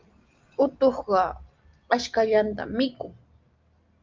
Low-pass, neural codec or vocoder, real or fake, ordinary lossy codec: 7.2 kHz; none; real; Opus, 24 kbps